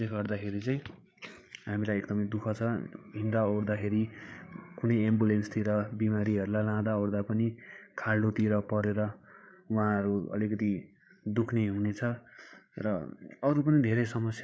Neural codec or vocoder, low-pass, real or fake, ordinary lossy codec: codec, 16 kHz, 8 kbps, FreqCodec, larger model; none; fake; none